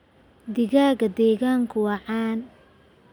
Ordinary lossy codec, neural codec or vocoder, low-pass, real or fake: none; none; 19.8 kHz; real